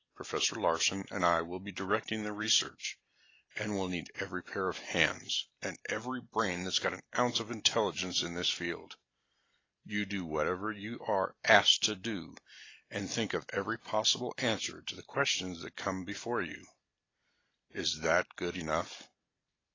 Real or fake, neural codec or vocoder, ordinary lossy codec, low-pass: real; none; AAC, 32 kbps; 7.2 kHz